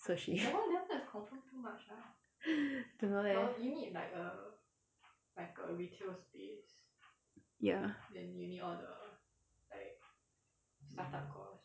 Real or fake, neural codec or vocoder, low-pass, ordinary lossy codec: real; none; none; none